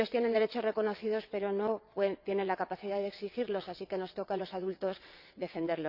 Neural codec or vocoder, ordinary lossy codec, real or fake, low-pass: vocoder, 22.05 kHz, 80 mel bands, WaveNeXt; none; fake; 5.4 kHz